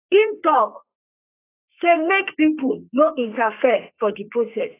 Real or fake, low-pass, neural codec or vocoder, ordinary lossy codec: fake; 3.6 kHz; codec, 32 kHz, 1.9 kbps, SNAC; AAC, 24 kbps